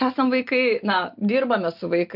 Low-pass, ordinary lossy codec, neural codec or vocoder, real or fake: 5.4 kHz; MP3, 48 kbps; none; real